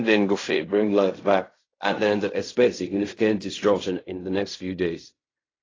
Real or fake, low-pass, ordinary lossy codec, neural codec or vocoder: fake; 7.2 kHz; AAC, 32 kbps; codec, 16 kHz in and 24 kHz out, 0.4 kbps, LongCat-Audio-Codec, fine tuned four codebook decoder